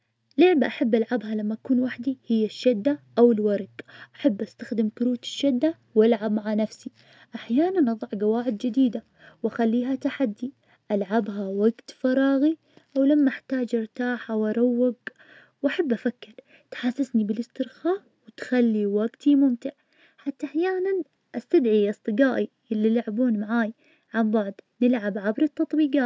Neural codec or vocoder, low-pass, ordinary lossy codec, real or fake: none; none; none; real